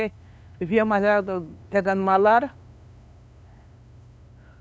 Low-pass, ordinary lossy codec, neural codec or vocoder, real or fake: none; none; codec, 16 kHz, 2 kbps, FunCodec, trained on LibriTTS, 25 frames a second; fake